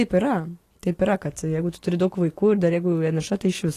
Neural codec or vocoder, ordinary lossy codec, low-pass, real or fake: none; AAC, 48 kbps; 14.4 kHz; real